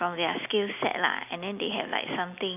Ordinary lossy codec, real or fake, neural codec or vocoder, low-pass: none; real; none; 3.6 kHz